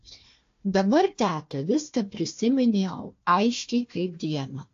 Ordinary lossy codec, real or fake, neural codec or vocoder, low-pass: AAC, 48 kbps; fake; codec, 16 kHz, 1 kbps, FunCodec, trained on Chinese and English, 50 frames a second; 7.2 kHz